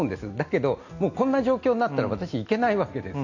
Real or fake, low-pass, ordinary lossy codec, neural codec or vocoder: real; 7.2 kHz; none; none